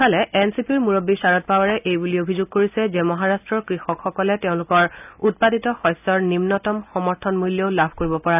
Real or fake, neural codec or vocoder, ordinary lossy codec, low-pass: real; none; none; 3.6 kHz